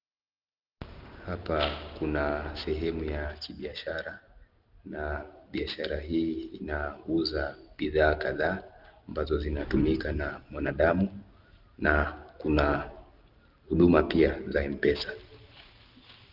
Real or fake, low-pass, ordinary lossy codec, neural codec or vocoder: real; 5.4 kHz; Opus, 32 kbps; none